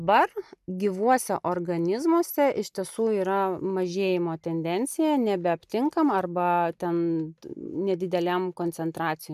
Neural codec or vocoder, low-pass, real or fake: none; 14.4 kHz; real